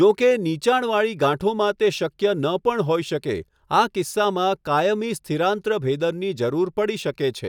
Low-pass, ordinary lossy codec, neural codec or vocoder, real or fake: 19.8 kHz; none; none; real